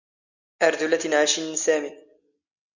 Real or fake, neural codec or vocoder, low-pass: real; none; 7.2 kHz